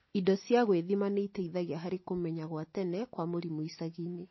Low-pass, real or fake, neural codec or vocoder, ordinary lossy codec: 7.2 kHz; real; none; MP3, 24 kbps